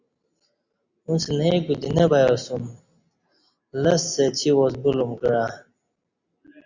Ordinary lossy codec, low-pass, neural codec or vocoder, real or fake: Opus, 64 kbps; 7.2 kHz; none; real